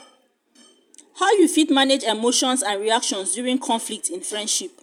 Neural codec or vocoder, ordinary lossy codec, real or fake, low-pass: vocoder, 44.1 kHz, 128 mel bands every 256 samples, BigVGAN v2; none; fake; 19.8 kHz